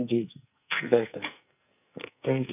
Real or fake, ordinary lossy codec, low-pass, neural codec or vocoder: fake; none; 3.6 kHz; codec, 44.1 kHz, 2.6 kbps, SNAC